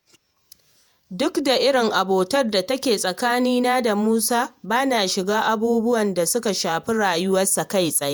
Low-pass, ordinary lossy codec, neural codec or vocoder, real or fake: none; none; vocoder, 48 kHz, 128 mel bands, Vocos; fake